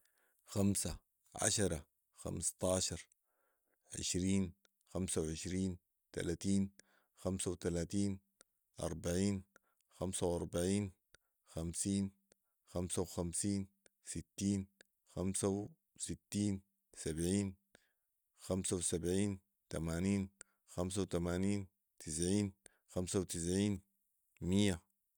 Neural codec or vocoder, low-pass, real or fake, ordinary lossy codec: none; none; real; none